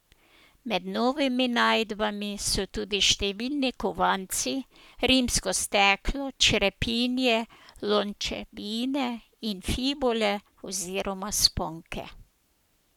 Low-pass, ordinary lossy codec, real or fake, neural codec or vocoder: 19.8 kHz; none; fake; codec, 44.1 kHz, 7.8 kbps, Pupu-Codec